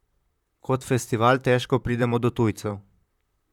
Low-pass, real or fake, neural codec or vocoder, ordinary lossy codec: 19.8 kHz; fake; vocoder, 44.1 kHz, 128 mel bands, Pupu-Vocoder; none